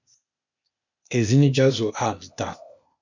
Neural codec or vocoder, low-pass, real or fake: codec, 16 kHz, 0.8 kbps, ZipCodec; 7.2 kHz; fake